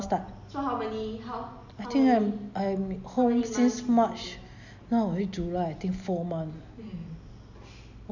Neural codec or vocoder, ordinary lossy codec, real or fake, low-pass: none; none; real; 7.2 kHz